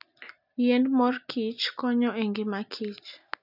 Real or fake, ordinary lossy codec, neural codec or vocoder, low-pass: real; none; none; 5.4 kHz